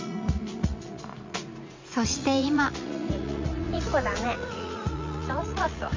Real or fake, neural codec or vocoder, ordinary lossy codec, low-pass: fake; vocoder, 44.1 kHz, 80 mel bands, Vocos; MP3, 64 kbps; 7.2 kHz